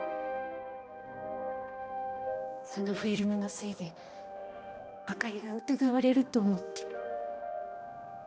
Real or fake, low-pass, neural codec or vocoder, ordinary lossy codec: fake; none; codec, 16 kHz, 1 kbps, X-Codec, HuBERT features, trained on balanced general audio; none